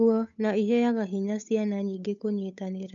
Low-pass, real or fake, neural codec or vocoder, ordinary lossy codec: 7.2 kHz; fake; codec, 16 kHz, 8 kbps, FunCodec, trained on Chinese and English, 25 frames a second; none